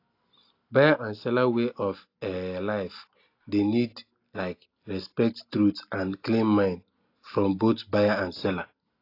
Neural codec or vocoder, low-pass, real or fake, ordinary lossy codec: none; 5.4 kHz; real; AAC, 32 kbps